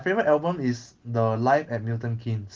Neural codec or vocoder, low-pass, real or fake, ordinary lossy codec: none; 7.2 kHz; real; Opus, 16 kbps